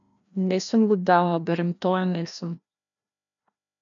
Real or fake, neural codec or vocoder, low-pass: fake; codec, 16 kHz, 1 kbps, FreqCodec, larger model; 7.2 kHz